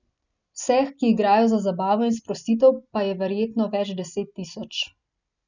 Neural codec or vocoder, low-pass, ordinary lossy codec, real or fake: none; 7.2 kHz; none; real